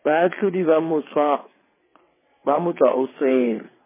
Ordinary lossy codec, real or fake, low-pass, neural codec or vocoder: MP3, 16 kbps; fake; 3.6 kHz; vocoder, 22.05 kHz, 80 mel bands, WaveNeXt